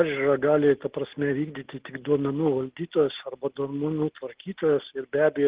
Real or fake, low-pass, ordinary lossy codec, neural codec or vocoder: real; 3.6 kHz; Opus, 16 kbps; none